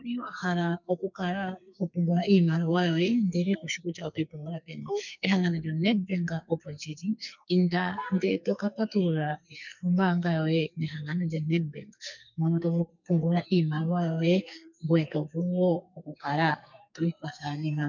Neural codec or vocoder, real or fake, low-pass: codec, 44.1 kHz, 2.6 kbps, SNAC; fake; 7.2 kHz